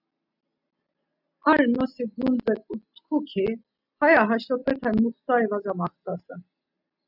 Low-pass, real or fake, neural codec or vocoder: 5.4 kHz; real; none